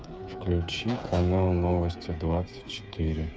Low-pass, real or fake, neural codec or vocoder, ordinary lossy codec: none; fake; codec, 16 kHz, 8 kbps, FreqCodec, smaller model; none